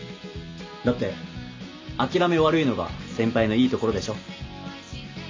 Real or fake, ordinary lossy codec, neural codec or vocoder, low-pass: real; MP3, 48 kbps; none; 7.2 kHz